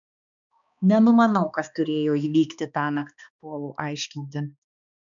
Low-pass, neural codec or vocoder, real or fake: 7.2 kHz; codec, 16 kHz, 2 kbps, X-Codec, HuBERT features, trained on balanced general audio; fake